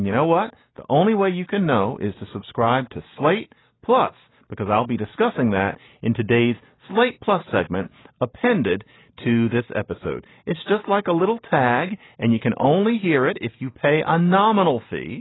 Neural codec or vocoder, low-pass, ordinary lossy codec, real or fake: none; 7.2 kHz; AAC, 16 kbps; real